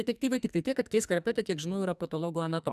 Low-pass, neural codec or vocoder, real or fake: 14.4 kHz; codec, 44.1 kHz, 2.6 kbps, SNAC; fake